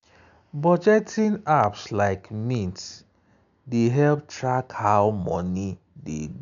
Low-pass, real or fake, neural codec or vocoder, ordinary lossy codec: 7.2 kHz; real; none; none